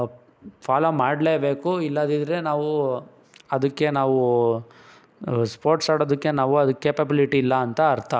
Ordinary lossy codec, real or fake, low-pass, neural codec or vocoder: none; real; none; none